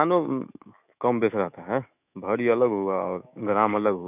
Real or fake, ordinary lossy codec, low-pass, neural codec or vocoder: fake; none; 3.6 kHz; autoencoder, 48 kHz, 128 numbers a frame, DAC-VAE, trained on Japanese speech